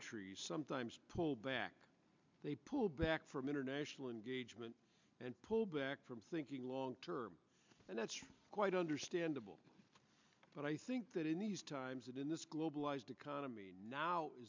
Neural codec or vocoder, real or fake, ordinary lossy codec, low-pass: none; real; MP3, 64 kbps; 7.2 kHz